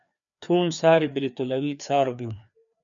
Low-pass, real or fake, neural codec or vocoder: 7.2 kHz; fake; codec, 16 kHz, 2 kbps, FreqCodec, larger model